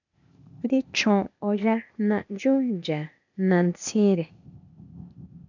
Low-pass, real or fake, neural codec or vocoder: 7.2 kHz; fake; codec, 16 kHz, 0.8 kbps, ZipCodec